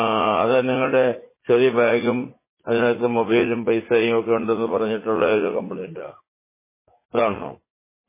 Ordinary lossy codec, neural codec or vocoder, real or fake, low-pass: MP3, 16 kbps; vocoder, 44.1 kHz, 80 mel bands, Vocos; fake; 3.6 kHz